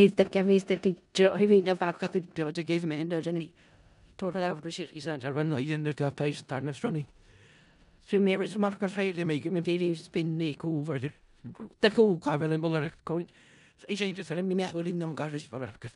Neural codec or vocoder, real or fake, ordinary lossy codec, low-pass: codec, 16 kHz in and 24 kHz out, 0.4 kbps, LongCat-Audio-Codec, four codebook decoder; fake; none; 10.8 kHz